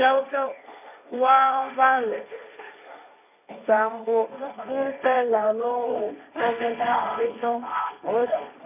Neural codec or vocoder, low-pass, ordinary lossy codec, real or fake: codec, 16 kHz, 1.1 kbps, Voila-Tokenizer; 3.6 kHz; none; fake